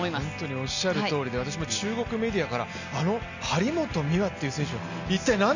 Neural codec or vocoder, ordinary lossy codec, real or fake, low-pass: none; none; real; 7.2 kHz